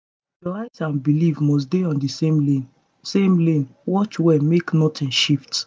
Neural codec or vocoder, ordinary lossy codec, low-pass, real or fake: none; none; none; real